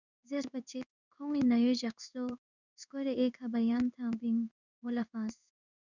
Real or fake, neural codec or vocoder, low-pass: fake; codec, 16 kHz in and 24 kHz out, 1 kbps, XY-Tokenizer; 7.2 kHz